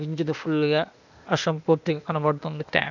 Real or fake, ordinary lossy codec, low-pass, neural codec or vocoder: fake; none; 7.2 kHz; codec, 16 kHz, 0.8 kbps, ZipCodec